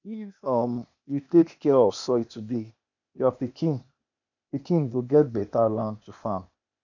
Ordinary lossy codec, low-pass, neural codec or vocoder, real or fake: none; 7.2 kHz; codec, 16 kHz, 0.8 kbps, ZipCodec; fake